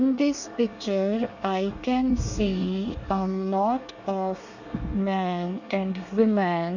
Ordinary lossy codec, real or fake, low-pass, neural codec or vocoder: none; fake; 7.2 kHz; codec, 24 kHz, 1 kbps, SNAC